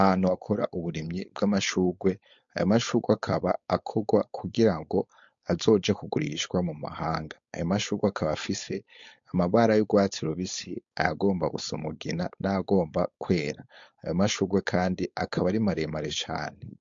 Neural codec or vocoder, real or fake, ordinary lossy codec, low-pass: codec, 16 kHz, 4.8 kbps, FACodec; fake; MP3, 48 kbps; 7.2 kHz